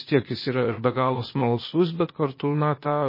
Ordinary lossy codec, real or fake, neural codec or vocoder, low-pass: MP3, 24 kbps; fake; codec, 16 kHz, 0.8 kbps, ZipCodec; 5.4 kHz